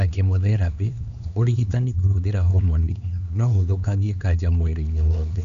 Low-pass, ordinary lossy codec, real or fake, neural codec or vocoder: 7.2 kHz; none; fake; codec, 16 kHz, 2 kbps, X-Codec, HuBERT features, trained on LibriSpeech